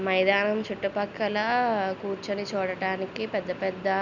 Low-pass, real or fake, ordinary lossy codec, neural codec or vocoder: 7.2 kHz; real; none; none